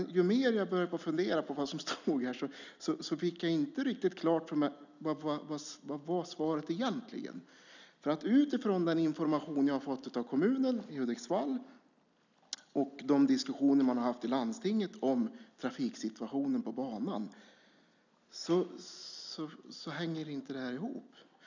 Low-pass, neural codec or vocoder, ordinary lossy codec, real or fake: 7.2 kHz; none; none; real